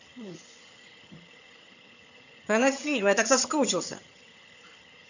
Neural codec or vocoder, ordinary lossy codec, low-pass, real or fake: vocoder, 22.05 kHz, 80 mel bands, HiFi-GAN; none; 7.2 kHz; fake